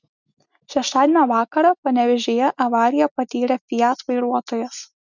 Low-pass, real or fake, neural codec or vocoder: 7.2 kHz; real; none